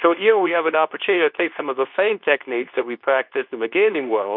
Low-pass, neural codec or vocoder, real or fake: 5.4 kHz; codec, 24 kHz, 0.9 kbps, WavTokenizer, medium speech release version 2; fake